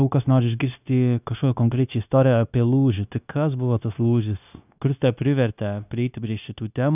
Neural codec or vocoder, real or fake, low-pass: codec, 16 kHz, 0.9 kbps, LongCat-Audio-Codec; fake; 3.6 kHz